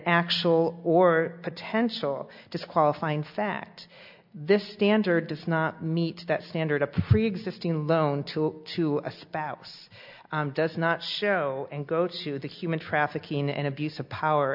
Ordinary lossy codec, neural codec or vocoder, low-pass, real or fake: MP3, 48 kbps; none; 5.4 kHz; real